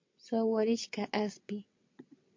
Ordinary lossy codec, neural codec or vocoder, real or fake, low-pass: MP3, 48 kbps; vocoder, 22.05 kHz, 80 mel bands, WaveNeXt; fake; 7.2 kHz